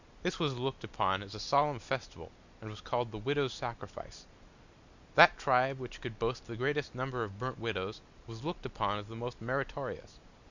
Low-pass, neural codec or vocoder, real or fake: 7.2 kHz; none; real